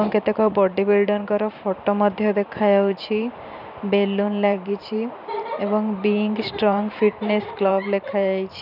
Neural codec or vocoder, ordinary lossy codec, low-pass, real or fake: vocoder, 44.1 kHz, 128 mel bands every 512 samples, BigVGAN v2; none; 5.4 kHz; fake